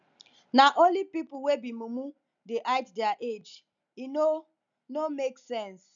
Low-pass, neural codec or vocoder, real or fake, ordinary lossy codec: 7.2 kHz; none; real; none